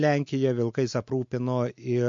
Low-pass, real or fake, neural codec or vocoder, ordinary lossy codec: 7.2 kHz; real; none; MP3, 48 kbps